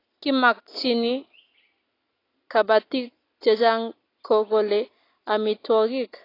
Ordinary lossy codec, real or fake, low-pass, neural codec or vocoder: AAC, 24 kbps; real; 5.4 kHz; none